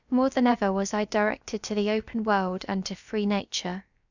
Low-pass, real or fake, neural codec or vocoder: 7.2 kHz; fake; codec, 16 kHz, about 1 kbps, DyCAST, with the encoder's durations